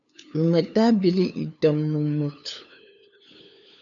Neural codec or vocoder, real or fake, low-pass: codec, 16 kHz, 2 kbps, FunCodec, trained on LibriTTS, 25 frames a second; fake; 7.2 kHz